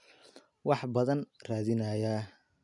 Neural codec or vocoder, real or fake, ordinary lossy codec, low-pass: none; real; none; 10.8 kHz